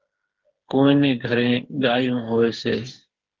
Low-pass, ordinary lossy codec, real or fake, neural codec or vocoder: 7.2 kHz; Opus, 16 kbps; fake; codec, 16 kHz, 4 kbps, FreqCodec, smaller model